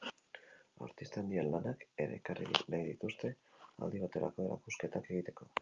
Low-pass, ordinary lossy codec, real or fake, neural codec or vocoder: 7.2 kHz; Opus, 24 kbps; real; none